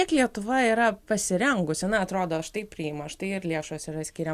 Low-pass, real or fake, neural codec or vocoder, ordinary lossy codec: 14.4 kHz; real; none; Opus, 64 kbps